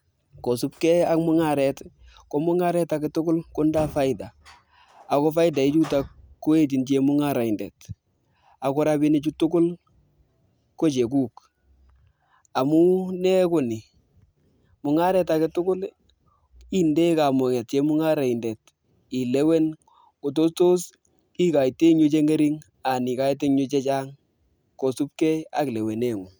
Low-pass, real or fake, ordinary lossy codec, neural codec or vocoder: none; real; none; none